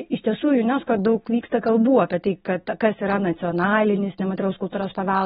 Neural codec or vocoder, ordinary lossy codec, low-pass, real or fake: none; AAC, 16 kbps; 14.4 kHz; real